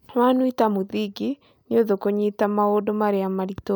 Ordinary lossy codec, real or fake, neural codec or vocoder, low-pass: none; real; none; none